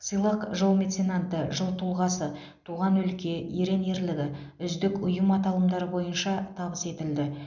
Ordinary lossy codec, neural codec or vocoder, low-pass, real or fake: none; none; 7.2 kHz; real